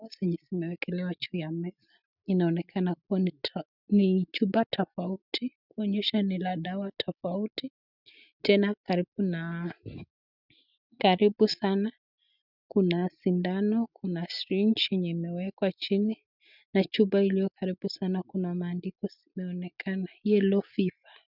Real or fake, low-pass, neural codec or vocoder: real; 5.4 kHz; none